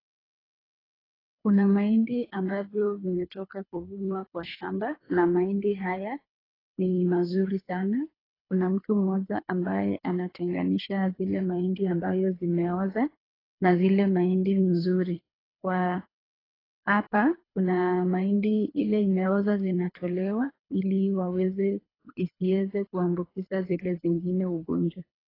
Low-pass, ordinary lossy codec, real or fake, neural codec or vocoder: 5.4 kHz; AAC, 24 kbps; fake; codec, 24 kHz, 3 kbps, HILCodec